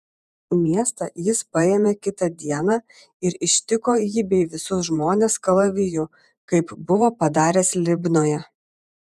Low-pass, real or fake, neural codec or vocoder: 14.4 kHz; fake; vocoder, 48 kHz, 128 mel bands, Vocos